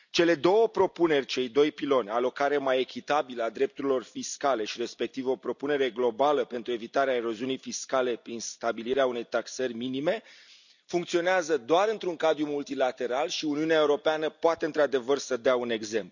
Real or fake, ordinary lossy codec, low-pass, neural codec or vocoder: real; none; 7.2 kHz; none